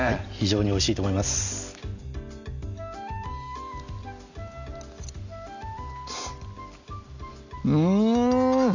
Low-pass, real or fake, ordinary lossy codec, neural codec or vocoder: 7.2 kHz; real; none; none